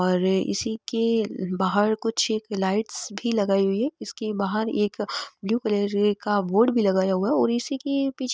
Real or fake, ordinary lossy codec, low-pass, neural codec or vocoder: real; none; none; none